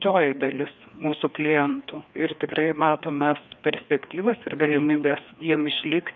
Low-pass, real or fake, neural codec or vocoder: 7.2 kHz; fake; codec, 16 kHz, 2 kbps, FreqCodec, larger model